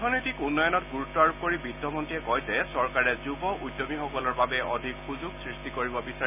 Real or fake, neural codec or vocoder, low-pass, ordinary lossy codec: real; none; 3.6 kHz; none